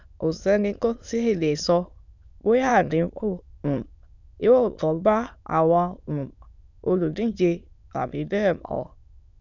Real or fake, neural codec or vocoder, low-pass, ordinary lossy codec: fake; autoencoder, 22.05 kHz, a latent of 192 numbers a frame, VITS, trained on many speakers; 7.2 kHz; none